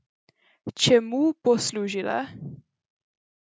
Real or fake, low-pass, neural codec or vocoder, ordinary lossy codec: real; none; none; none